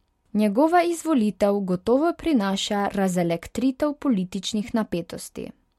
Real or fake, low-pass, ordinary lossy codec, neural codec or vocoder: real; 19.8 kHz; MP3, 64 kbps; none